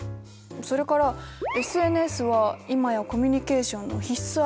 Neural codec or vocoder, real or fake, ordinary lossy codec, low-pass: none; real; none; none